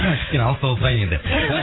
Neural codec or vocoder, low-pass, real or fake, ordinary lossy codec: vocoder, 44.1 kHz, 80 mel bands, Vocos; 7.2 kHz; fake; AAC, 16 kbps